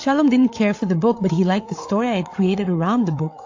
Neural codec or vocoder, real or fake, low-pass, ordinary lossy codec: codec, 16 kHz, 8 kbps, FreqCodec, larger model; fake; 7.2 kHz; AAC, 48 kbps